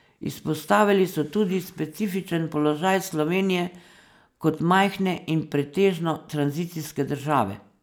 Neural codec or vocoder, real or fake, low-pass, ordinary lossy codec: none; real; none; none